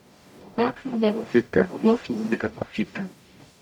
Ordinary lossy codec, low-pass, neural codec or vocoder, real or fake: none; 19.8 kHz; codec, 44.1 kHz, 0.9 kbps, DAC; fake